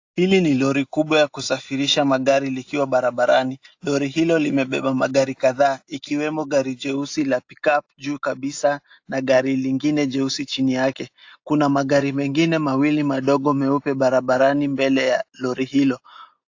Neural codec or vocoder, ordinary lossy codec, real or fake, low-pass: none; AAC, 48 kbps; real; 7.2 kHz